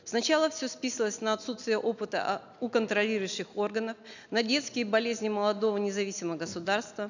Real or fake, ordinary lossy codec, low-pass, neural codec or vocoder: real; none; 7.2 kHz; none